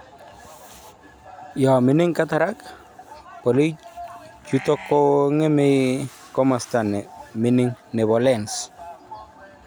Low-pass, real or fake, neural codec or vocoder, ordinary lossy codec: none; real; none; none